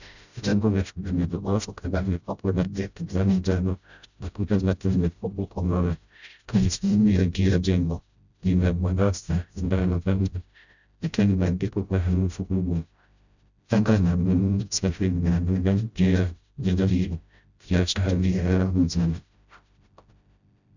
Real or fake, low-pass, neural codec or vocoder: fake; 7.2 kHz; codec, 16 kHz, 0.5 kbps, FreqCodec, smaller model